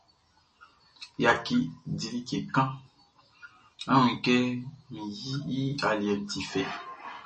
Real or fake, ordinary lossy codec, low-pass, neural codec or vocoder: real; MP3, 32 kbps; 10.8 kHz; none